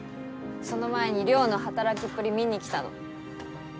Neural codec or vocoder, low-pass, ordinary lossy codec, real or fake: none; none; none; real